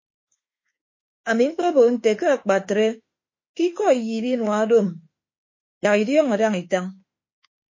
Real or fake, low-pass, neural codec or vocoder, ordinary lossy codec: fake; 7.2 kHz; autoencoder, 48 kHz, 32 numbers a frame, DAC-VAE, trained on Japanese speech; MP3, 32 kbps